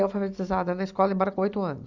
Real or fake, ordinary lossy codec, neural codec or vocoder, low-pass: real; Opus, 64 kbps; none; 7.2 kHz